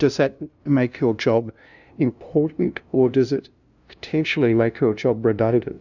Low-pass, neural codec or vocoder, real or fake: 7.2 kHz; codec, 16 kHz, 0.5 kbps, FunCodec, trained on LibriTTS, 25 frames a second; fake